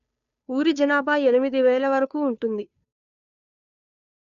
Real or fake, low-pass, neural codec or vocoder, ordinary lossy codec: fake; 7.2 kHz; codec, 16 kHz, 2 kbps, FunCodec, trained on Chinese and English, 25 frames a second; none